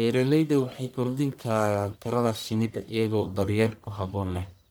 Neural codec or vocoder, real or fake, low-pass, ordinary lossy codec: codec, 44.1 kHz, 1.7 kbps, Pupu-Codec; fake; none; none